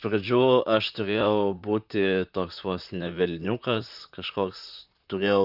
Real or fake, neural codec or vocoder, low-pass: fake; vocoder, 44.1 kHz, 128 mel bands, Pupu-Vocoder; 5.4 kHz